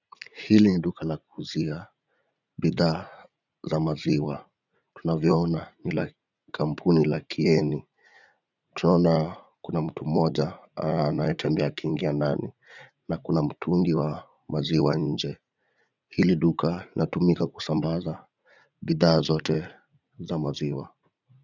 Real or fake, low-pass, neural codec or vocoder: fake; 7.2 kHz; vocoder, 44.1 kHz, 80 mel bands, Vocos